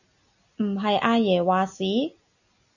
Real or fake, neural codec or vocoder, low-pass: real; none; 7.2 kHz